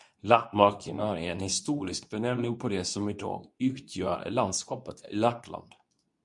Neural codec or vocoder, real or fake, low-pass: codec, 24 kHz, 0.9 kbps, WavTokenizer, medium speech release version 2; fake; 10.8 kHz